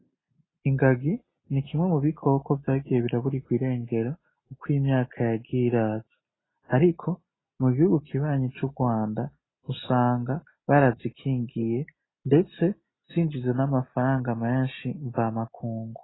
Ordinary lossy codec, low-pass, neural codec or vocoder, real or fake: AAC, 16 kbps; 7.2 kHz; none; real